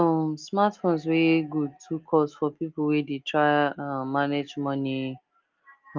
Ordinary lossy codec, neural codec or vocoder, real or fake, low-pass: Opus, 32 kbps; none; real; 7.2 kHz